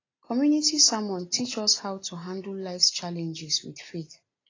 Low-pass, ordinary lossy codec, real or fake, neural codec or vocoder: 7.2 kHz; AAC, 32 kbps; real; none